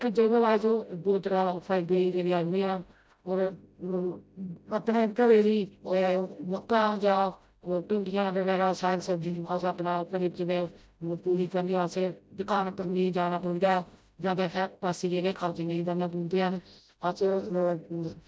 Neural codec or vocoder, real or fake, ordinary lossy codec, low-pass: codec, 16 kHz, 0.5 kbps, FreqCodec, smaller model; fake; none; none